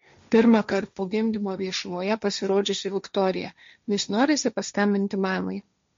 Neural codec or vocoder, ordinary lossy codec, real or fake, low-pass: codec, 16 kHz, 1.1 kbps, Voila-Tokenizer; MP3, 48 kbps; fake; 7.2 kHz